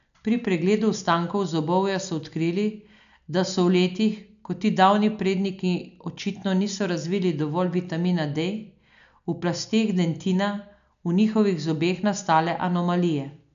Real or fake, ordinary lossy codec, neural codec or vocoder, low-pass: real; none; none; 7.2 kHz